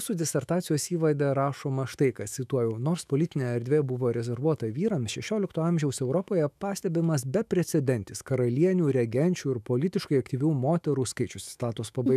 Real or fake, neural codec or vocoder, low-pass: fake; autoencoder, 48 kHz, 128 numbers a frame, DAC-VAE, trained on Japanese speech; 14.4 kHz